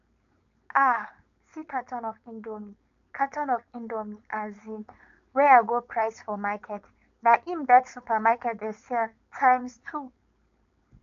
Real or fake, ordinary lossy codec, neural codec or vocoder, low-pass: fake; MP3, 64 kbps; codec, 16 kHz, 4.8 kbps, FACodec; 7.2 kHz